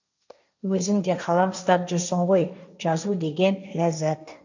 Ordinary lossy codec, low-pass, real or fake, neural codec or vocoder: none; 7.2 kHz; fake; codec, 16 kHz, 1.1 kbps, Voila-Tokenizer